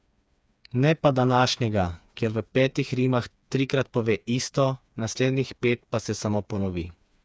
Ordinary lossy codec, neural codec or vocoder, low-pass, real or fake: none; codec, 16 kHz, 4 kbps, FreqCodec, smaller model; none; fake